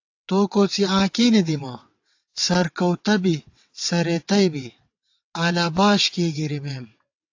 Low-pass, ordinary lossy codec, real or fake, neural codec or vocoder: 7.2 kHz; AAC, 48 kbps; fake; vocoder, 22.05 kHz, 80 mel bands, WaveNeXt